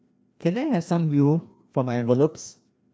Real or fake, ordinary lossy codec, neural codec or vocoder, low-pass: fake; none; codec, 16 kHz, 1 kbps, FreqCodec, larger model; none